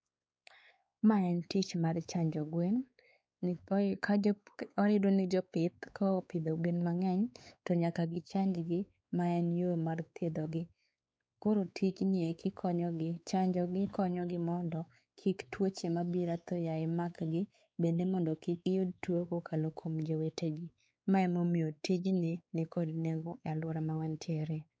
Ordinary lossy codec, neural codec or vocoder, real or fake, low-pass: none; codec, 16 kHz, 4 kbps, X-Codec, WavLM features, trained on Multilingual LibriSpeech; fake; none